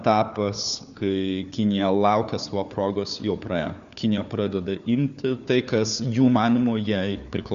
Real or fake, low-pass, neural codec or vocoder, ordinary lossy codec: fake; 7.2 kHz; codec, 16 kHz, 4 kbps, FunCodec, trained on Chinese and English, 50 frames a second; Opus, 64 kbps